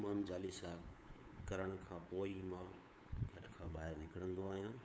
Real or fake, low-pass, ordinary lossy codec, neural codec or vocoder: fake; none; none; codec, 16 kHz, 8 kbps, FunCodec, trained on LibriTTS, 25 frames a second